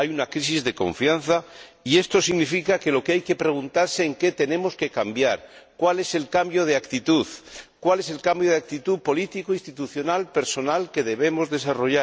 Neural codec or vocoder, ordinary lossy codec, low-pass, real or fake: none; none; none; real